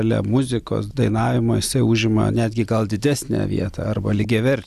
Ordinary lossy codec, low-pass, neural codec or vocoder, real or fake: Opus, 64 kbps; 14.4 kHz; none; real